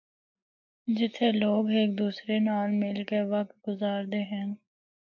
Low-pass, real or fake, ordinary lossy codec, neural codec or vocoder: 7.2 kHz; real; AAC, 48 kbps; none